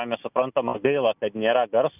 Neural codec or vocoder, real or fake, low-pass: none; real; 3.6 kHz